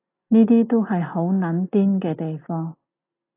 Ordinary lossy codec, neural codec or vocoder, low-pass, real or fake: AAC, 24 kbps; none; 3.6 kHz; real